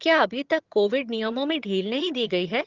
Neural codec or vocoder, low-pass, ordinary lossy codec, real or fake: vocoder, 22.05 kHz, 80 mel bands, HiFi-GAN; 7.2 kHz; Opus, 16 kbps; fake